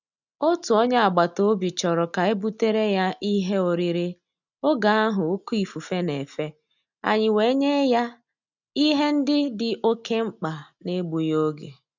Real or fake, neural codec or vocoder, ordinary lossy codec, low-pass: real; none; none; 7.2 kHz